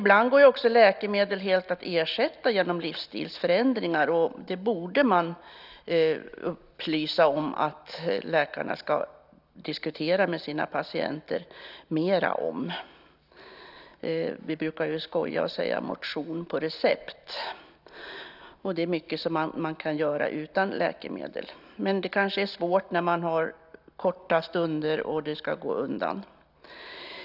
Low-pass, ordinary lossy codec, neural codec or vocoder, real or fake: 5.4 kHz; none; none; real